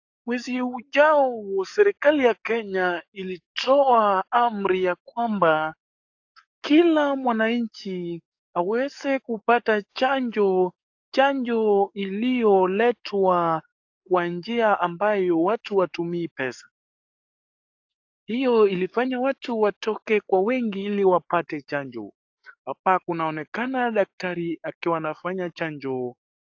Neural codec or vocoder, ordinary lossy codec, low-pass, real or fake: codec, 44.1 kHz, 7.8 kbps, DAC; AAC, 48 kbps; 7.2 kHz; fake